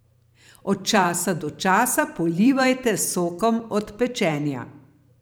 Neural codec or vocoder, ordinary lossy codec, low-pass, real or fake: vocoder, 44.1 kHz, 128 mel bands every 512 samples, BigVGAN v2; none; none; fake